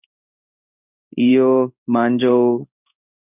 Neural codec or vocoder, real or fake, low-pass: codec, 16 kHz in and 24 kHz out, 1 kbps, XY-Tokenizer; fake; 3.6 kHz